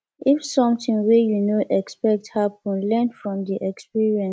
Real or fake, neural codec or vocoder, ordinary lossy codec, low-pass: real; none; none; none